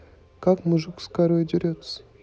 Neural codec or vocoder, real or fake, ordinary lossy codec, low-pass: none; real; none; none